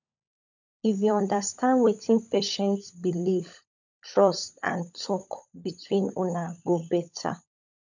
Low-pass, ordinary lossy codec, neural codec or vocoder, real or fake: 7.2 kHz; none; codec, 16 kHz, 16 kbps, FunCodec, trained on LibriTTS, 50 frames a second; fake